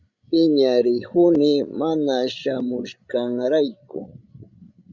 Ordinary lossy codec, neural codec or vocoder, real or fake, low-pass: Opus, 64 kbps; codec, 16 kHz, 16 kbps, FreqCodec, larger model; fake; 7.2 kHz